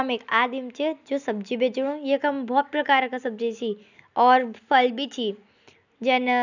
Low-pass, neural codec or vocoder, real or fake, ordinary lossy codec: 7.2 kHz; none; real; none